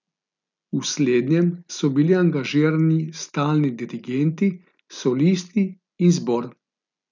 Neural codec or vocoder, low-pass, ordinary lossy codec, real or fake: none; 7.2 kHz; none; real